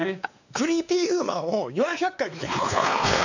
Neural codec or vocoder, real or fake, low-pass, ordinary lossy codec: codec, 16 kHz, 2 kbps, X-Codec, WavLM features, trained on Multilingual LibriSpeech; fake; 7.2 kHz; none